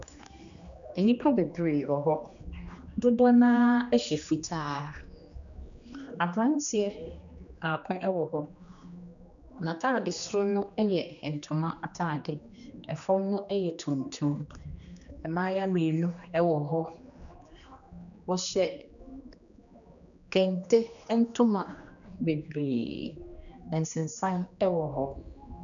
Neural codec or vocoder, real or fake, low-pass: codec, 16 kHz, 2 kbps, X-Codec, HuBERT features, trained on general audio; fake; 7.2 kHz